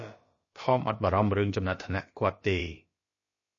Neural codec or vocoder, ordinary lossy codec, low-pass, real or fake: codec, 16 kHz, about 1 kbps, DyCAST, with the encoder's durations; MP3, 32 kbps; 7.2 kHz; fake